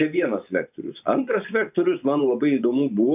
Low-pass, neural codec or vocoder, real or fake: 3.6 kHz; vocoder, 24 kHz, 100 mel bands, Vocos; fake